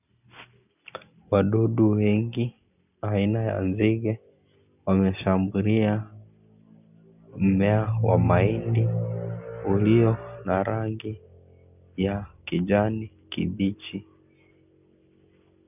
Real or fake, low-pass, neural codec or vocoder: real; 3.6 kHz; none